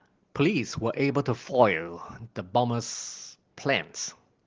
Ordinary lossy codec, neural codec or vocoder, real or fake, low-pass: Opus, 16 kbps; none; real; 7.2 kHz